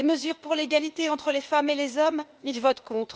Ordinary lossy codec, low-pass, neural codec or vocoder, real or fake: none; none; codec, 16 kHz, 0.8 kbps, ZipCodec; fake